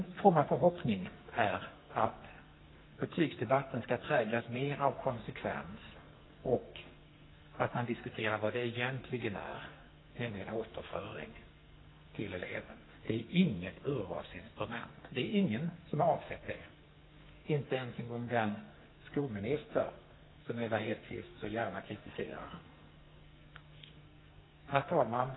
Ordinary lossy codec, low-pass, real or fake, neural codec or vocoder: AAC, 16 kbps; 7.2 kHz; fake; codec, 44.1 kHz, 2.6 kbps, SNAC